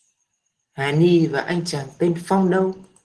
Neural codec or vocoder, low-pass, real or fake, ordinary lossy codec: none; 10.8 kHz; real; Opus, 16 kbps